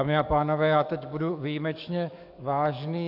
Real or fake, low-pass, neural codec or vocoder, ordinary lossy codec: fake; 5.4 kHz; codec, 44.1 kHz, 7.8 kbps, DAC; AAC, 48 kbps